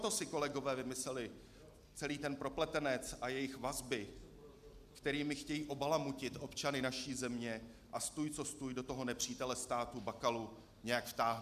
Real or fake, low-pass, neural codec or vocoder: real; 14.4 kHz; none